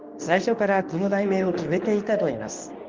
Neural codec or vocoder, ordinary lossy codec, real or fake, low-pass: codec, 24 kHz, 0.9 kbps, WavTokenizer, medium speech release version 2; Opus, 32 kbps; fake; 7.2 kHz